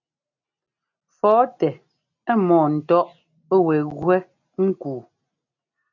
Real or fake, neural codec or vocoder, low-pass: real; none; 7.2 kHz